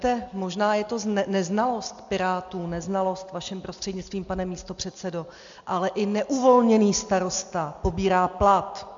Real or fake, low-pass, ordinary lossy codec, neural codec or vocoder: real; 7.2 kHz; AAC, 64 kbps; none